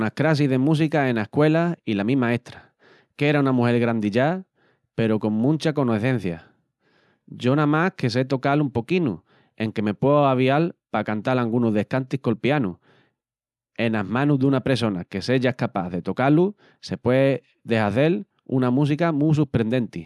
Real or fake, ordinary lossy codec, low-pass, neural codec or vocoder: real; none; none; none